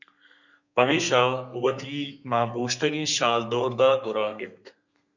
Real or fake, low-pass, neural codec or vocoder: fake; 7.2 kHz; codec, 32 kHz, 1.9 kbps, SNAC